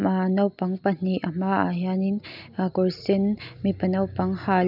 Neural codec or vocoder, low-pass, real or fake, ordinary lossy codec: none; 5.4 kHz; real; none